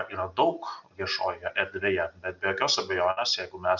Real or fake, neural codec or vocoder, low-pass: real; none; 7.2 kHz